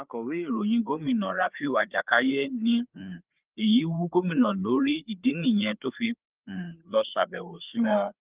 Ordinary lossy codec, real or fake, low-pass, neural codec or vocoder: Opus, 32 kbps; fake; 3.6 kHz; codec, 16 kHz, 4 kbps, FreqCodec, larger model